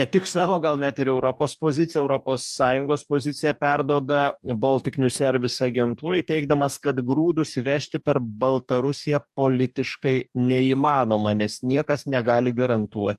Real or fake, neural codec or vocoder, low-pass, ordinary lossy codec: fake; codec, 44.1 kHz, 2.6 kbps, DAC; 14.4 kHz; AAC, 96 kbps